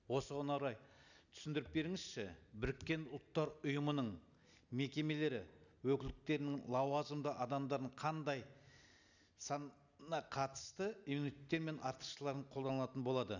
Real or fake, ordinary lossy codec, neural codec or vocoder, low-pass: real; none; none; 7.2 kHz